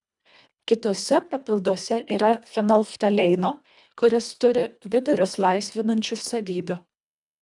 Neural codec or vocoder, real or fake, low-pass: codec, 24 kHz, 1.5 kbps, HILCodec; fake; 10.8 kHz